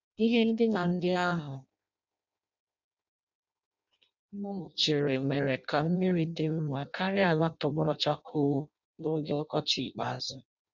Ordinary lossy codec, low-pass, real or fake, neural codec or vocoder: none; 7.2 kHz; fake; codec, 16 kHz in and 24 kHz out, 0.6 kbps, FireRedTTS-2 codec